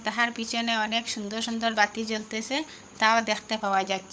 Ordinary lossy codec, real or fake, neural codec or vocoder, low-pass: none; fake; codec, 16 kHz, 16 kbps, FunCodec, trained on LibriTTS, 50 frames a second; none